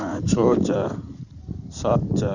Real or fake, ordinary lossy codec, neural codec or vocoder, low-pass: fake; none; codec, 16 kHz in and 24 kHz out, 2.2 kbps, FireRedTTS-2 codec; 7.2 kHz